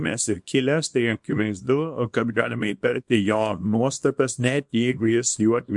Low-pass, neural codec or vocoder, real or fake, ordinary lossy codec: 10.8 kHz; codec, 24 kHz, 0.9 kbps, WavTokenizer, small release; fake; MP3, 64 kbps